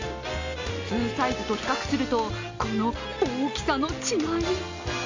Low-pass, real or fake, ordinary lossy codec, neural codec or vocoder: 7.2 kHz; real; MP3, 48 kbps; none